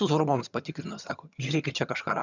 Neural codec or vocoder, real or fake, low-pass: vocoder, 22.05 kHz, 80 mel bands, HiFi-GAN; fake; 7.2 kHz